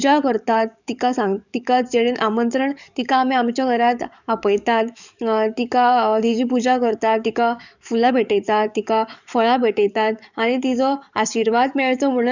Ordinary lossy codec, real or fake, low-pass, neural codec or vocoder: none; fake; 7.2 kHz; codec, 44.1 kHz, 7.8 kbps, DAC